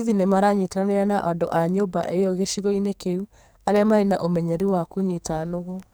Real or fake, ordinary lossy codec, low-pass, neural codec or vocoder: fake; none; none; codec, 44.1 kHz, 2.6 kbps, SNAC